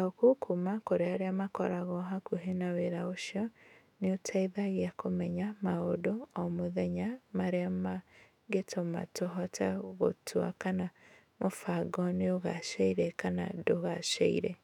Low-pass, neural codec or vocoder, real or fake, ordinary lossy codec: 19.8 kHz; autoencoder, 48 kHz, 128 numbers a frame, DAC-VAE, trained on Japanese speech; fake; none